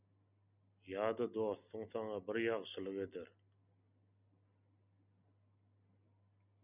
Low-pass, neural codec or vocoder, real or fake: 3.6 kHz; none; real